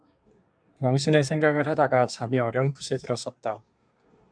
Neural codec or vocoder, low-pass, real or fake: codec, 24 kHz, 1 kbps, SNAC; 9.9 kHz; fake